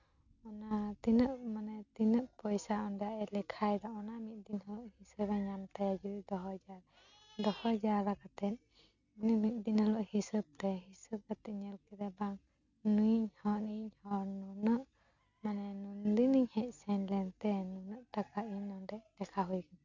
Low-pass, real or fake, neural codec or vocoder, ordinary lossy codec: 7.2 kHz; real; none; none